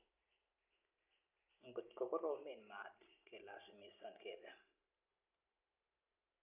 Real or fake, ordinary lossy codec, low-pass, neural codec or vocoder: real; none; 3.6 kHz; none